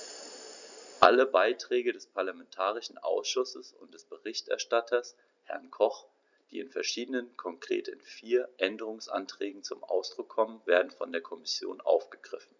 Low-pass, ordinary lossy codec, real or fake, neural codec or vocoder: 7.2 kHz; none; real; none